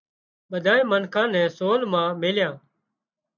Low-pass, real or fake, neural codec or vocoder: 7.2 kHz; real; none